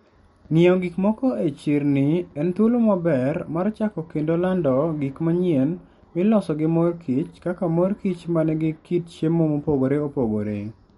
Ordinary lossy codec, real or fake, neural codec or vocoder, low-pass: MP3, 48 kbps; real; none; 19.8 kHz